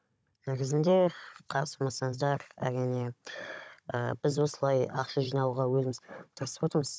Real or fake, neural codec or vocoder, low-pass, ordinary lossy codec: fake; codec, 16 kHz, 16 kbps, FunCodec, trained on Chinese and English, 50 frames a second; none; none